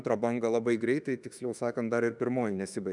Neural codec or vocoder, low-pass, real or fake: autoencoder, 48 kHz, 32 numbers a frame, DAC-VAE, trained on Japanese speech; 10.8 kHz; fake